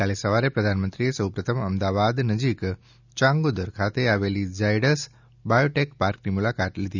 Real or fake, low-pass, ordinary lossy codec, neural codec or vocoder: real; 7.2 kHz; none; none